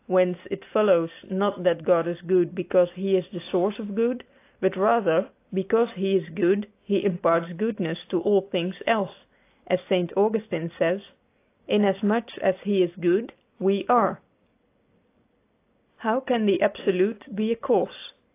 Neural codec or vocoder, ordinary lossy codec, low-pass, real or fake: none; AAC, 24 kbps; 3.6 kHz; real